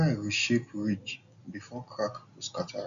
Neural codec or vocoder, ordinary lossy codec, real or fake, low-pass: none; none; real; 7.2 kHz